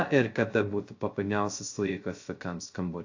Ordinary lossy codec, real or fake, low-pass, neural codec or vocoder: AAC, 48 kbps; fake; 7.2 kHz; codec, 16 kHz, 0.2 kbps, FocalCodec